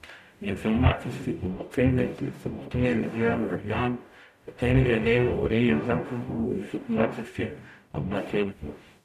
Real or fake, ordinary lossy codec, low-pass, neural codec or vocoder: fake; none; 14.4 kHz; codec, 44.1 kHz, 0.9 kbps, DAC